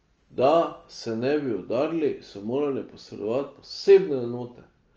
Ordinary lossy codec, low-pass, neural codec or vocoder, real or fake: Opus, 24 kbps; 7.2 kHz; none; real